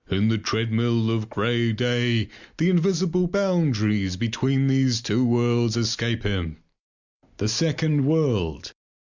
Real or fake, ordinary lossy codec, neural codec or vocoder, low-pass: real; Opus, 64 kbps; none; 7.2 kHz